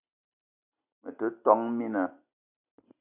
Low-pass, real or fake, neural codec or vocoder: 3.6 kHz; real; none